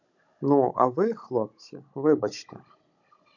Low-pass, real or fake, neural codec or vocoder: 7.2 kHz; fake; codec, 16 kHz, 16 kbps, FunCodec, trained on Chinese and English, 50 frames a second